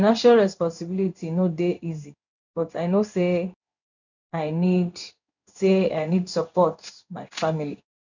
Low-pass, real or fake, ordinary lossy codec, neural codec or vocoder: 7.2 kHz; fake; none; codec, 16 kHz in and 24 kHz out, 1 kbps, XY-Tokenizer